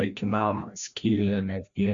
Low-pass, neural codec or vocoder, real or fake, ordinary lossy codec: 7.2 kHz; codec, 16 kHz, 1 kbps, FreqCodec, larger model; fake; Opus, 64 kbps